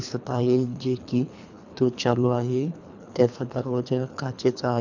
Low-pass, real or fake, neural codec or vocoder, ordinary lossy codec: 7.2 kHz; fake; codec, 24 kHz, 3 kbps, HILCodec; none